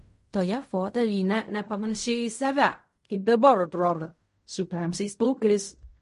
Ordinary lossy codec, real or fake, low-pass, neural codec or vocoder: MP3, 48 kbps; fake; 10.8 kHz; codec, 16 kHz in and 24 kHz out, 0.4 kbps, LongCat-Audio-Codec, fine tuned four codebook decoder